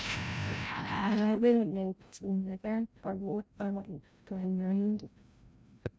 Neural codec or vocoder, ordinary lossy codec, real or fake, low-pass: codec, 16 kHz, 0.5 kbps, FreqCodec, larger model; none; fake; none